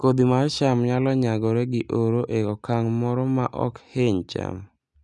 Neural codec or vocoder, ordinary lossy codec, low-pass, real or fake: none; none; none; real